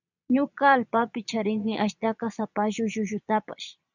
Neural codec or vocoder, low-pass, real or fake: vocoder, 22.05 kHz, 80 mel bands, Vocos; 7.2 kHz; fake